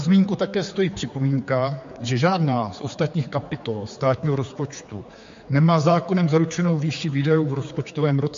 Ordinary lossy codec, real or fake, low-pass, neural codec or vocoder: MP3, 48 kbps; fake; 7.2 kHz; codec, 16 kHz, 4 kbps, X-Codec, HuBERT features, trained on general audio